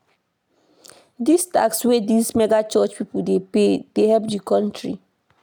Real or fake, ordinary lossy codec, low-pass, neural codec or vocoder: real; none; none; none